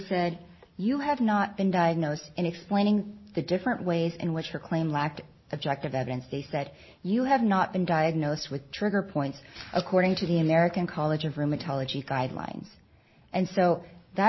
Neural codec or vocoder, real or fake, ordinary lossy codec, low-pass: none; real; MP3, 24 kbps; 7.2 kHz